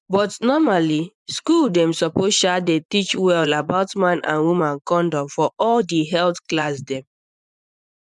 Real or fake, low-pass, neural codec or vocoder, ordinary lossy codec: fake; 10.8 kHz; vocoder, 24 kHz, 100 mel bands, Vocos; none